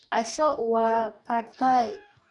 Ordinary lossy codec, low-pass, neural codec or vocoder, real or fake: none; 10.8 kHz; codec, 44.1 kHz, 2.6 kbps, DAC; fake